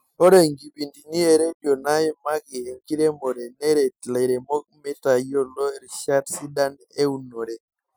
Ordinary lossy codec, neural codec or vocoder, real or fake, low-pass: none; none; real; none